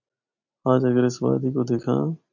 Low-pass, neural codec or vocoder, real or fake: 7.2 kHz; none; real